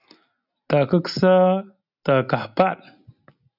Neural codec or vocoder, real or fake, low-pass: none; real; 5.4 kHz